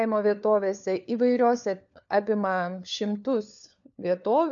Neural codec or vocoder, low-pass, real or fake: codec, 16 kHz, 4 kbps, FunCodec, trained on LibriTTS, 50 frames a second; 7.2 kHz; fake